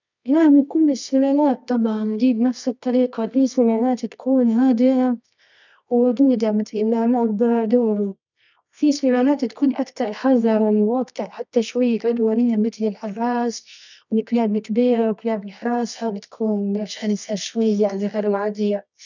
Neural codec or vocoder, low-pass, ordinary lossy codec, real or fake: codec, 24 kHz, 0.9 kbps, WavTokenizer, medium music audio release; 7.2 kHz; none; fake